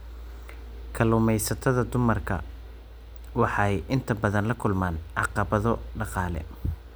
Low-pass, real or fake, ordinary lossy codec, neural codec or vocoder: none; real; none; none